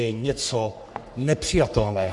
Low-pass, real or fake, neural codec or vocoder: 10.8 kHz; fake; codec, 44.1 kHz, 3.4 kbps, Pupu-Codec